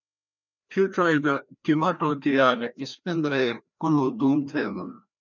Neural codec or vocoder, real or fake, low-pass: codec, 16 kHz, 1 kbps, FreqCodec, larger model; fake; 7.2 kHz